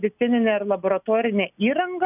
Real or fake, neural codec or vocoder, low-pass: real; none; 3.6 kHz